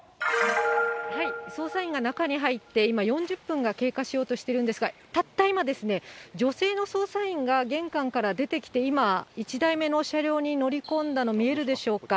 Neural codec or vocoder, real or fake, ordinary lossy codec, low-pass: none; real; none; none